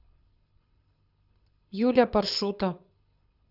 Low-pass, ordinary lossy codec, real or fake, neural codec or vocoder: 5.4 kHz; none; fake; codec, 24 kHz, 6 kbps, HILCodec